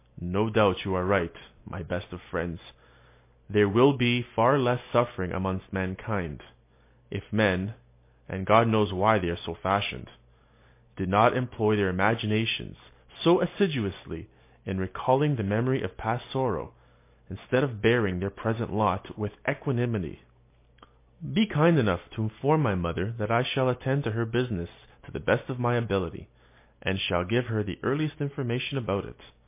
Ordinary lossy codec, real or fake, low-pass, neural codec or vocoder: MP3, 24 kbps; real; 3.6 kHz; none